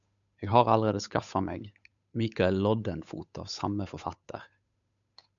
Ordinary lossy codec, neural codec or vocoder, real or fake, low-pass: MP3, 96 kbps; codec, 16 kHz, 8 kbps, FunCodec, trained on Chinese and English, 25 frames a second; fake; 7.2 kHz